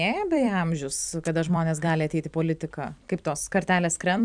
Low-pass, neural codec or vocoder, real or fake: 9.9 kHz; vocoder, 44.1 kHz, 128 mel bands every 256 samples, BigVGAN v2; fake